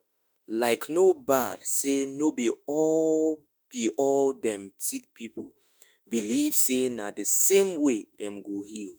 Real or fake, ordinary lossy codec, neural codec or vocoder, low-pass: fake; none; autoencoder, 48 kHz, 32 numbers a frame, DAC-VAE, trained on Japanese speech; none